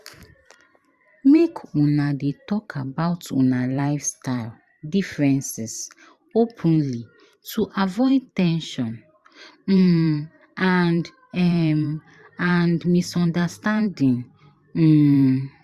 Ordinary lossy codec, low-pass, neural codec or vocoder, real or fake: Opus, 64 kbps; 14.4 kHz; vocoder, 44.1 kHz, 128 mel bands every 512 samples, BigVGAN v2; fake